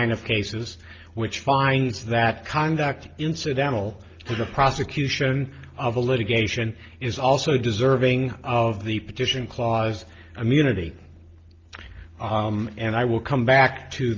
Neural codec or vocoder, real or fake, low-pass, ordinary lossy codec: none; real; 7.2 kHz; Opus, 24 kbps